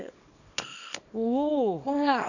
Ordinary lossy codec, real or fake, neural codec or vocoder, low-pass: none; fake; codec, 24 kHz, 0.9 kbps, WavTokenizer, small release; 7.2 kHz